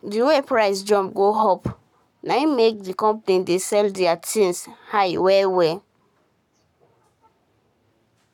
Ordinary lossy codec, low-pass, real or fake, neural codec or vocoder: none; 19.8 kHz; fake; codec, 44.1 kHz, 7.8 kbps, Pupu-Codec